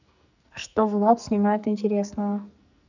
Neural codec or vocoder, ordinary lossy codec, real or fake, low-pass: codec, 44.1 kHz, 2.6 kbps, SNAC; none; fake; 7.2 kHz